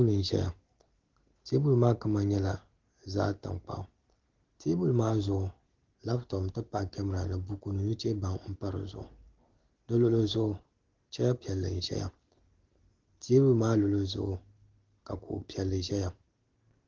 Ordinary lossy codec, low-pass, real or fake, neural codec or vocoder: Opus, 16 kbps; 7.2 kHz; real; none